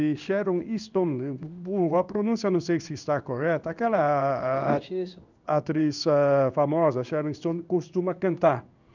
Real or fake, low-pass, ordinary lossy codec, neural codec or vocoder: fake; 7.2 kHz; none; codec, 16 kHz in and 24 kHz out, 1 kbps, XY-Tokenizer